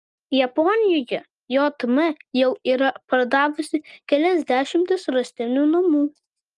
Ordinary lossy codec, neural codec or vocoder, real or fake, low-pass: Opus, 32 kbps; none; real; 10.8 kHz